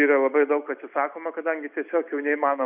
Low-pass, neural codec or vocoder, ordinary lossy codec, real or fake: 3.6 kHz; none; AAC, 32 kbps; real